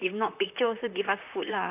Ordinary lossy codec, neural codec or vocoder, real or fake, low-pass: none; vocoder, 44.1 kHz, 128 mel bands, Pupu-Vocoder; fake; 3.6 kHz